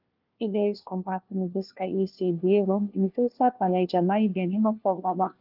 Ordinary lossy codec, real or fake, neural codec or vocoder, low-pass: Opus, 24 kbps; fake; codec, 16 kHz, 1 kbps, FunCodec, trained on LibriTTS, 50 frames a second; 5.4 kHz